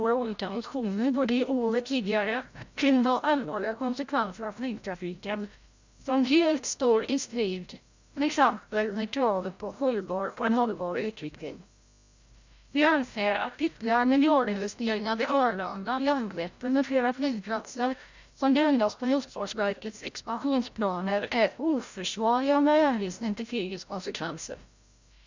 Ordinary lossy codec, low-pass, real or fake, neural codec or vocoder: Opus, 64 kbps; 7.2 kHz; fake; codec, 16 kHz, 0.5 kbps, FreqCodec, larger model